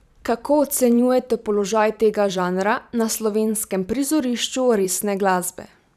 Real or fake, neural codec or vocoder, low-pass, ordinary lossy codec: fake; vocoder, 44.1 kHz, 128 mel bands every 512 samples, BigVGAN v2; 14.4 kHz; none